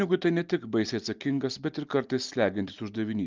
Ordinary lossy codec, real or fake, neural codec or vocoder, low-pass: Opus, 24 kbps; real; none; 7.2 kHz